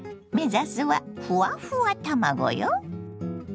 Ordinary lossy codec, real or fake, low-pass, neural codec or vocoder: none; real; none; none